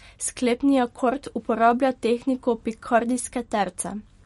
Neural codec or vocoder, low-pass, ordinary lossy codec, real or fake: none; 14.4 kHz; MP3, 48 kbps; real